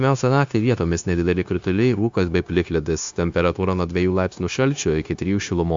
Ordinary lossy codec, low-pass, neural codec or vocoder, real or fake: AAC, 64 kbps; 7.2 kHz; codec, 16 kHz, 0.9 kbps, LongCat-Audio-Codec; fake